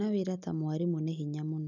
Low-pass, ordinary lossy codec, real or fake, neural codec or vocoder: 7.2 kHz; none; real; none